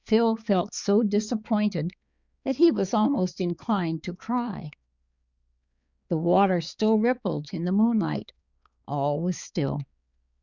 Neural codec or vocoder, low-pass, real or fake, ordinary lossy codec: codec, 16 kHz, 4 kbps, X-Codec, HuBERT features, trained on balanced general audio; 7.2 kHz; fake; Opus, 64 kbps